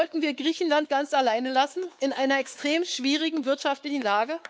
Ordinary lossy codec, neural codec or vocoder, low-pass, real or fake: none; codec, 16 kHz, 4 kbps, X-Codec, WavLM features, trained on Multilingual LibriSpeech; none; fake